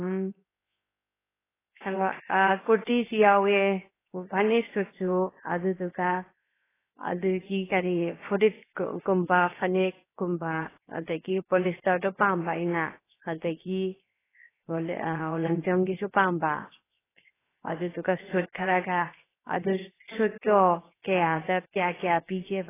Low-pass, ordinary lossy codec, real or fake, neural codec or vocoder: 3.6 kHz; AAC, 16 kbps; fake; codec, 16 kHz, 0.7 kbps, FocalCodec